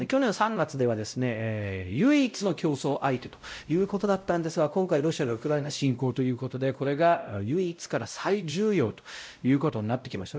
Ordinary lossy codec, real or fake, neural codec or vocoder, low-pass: none; fake; codec, 16 kHz, 0.5 kbps, X-Codec, WavLM features, trained on Multilingual LibriSpeech; none